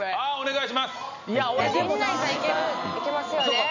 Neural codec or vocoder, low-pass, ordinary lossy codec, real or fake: none; 7.2 kHz; none; real